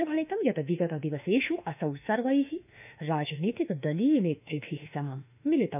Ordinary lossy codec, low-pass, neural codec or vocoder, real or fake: none; 3.6 kHz; autoencoder, 48 kHz, 32 numbers a frame, DAC-VAE, trained on Japanese speech; fake